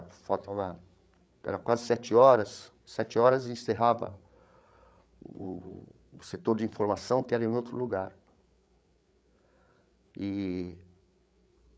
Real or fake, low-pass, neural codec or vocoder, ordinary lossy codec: fake; none; codec, 16 kHz, 8 kbps, FreqCodec, larger model; none